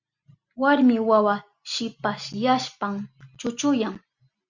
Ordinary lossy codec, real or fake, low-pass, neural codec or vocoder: Opus, 64 kbps; real; 7.2 kHz; none